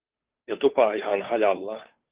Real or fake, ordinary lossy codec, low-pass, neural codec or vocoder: fake; Opus, 32 kbps; 3.6 kHz; codec, 16 kHz, 2 kbps, FunCodec, trained on Chinese and English, 25 frames a second